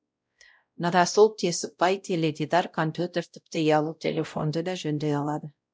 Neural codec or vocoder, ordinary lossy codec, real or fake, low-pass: codec, 16 kHz, 0.5 kbps, X-Codec, WavLM features, trained on Multilingual LibriSpeech; none; fake; none